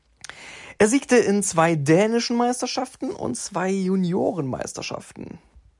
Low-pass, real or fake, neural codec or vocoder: 10.8 kHz; real; none